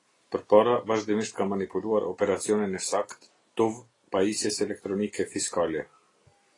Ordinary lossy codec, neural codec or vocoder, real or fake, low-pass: AAC, 32 kbps; none; real; 10.8 kHz